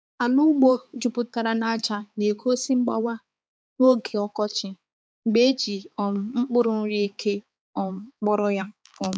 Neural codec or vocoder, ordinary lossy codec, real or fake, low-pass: codec, 16 kHz, 4 kbps, X-Codec, HuBERT features, trained on balanced general audio; none; fake; none